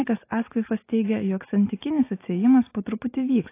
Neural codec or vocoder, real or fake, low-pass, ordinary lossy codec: none; real; 3.6 kHz; AAC, 24 kbps